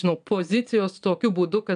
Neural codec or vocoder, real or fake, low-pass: vocoder, 22.05 kHz, 80 mel bands, Vocos; fake; 9.9 kHz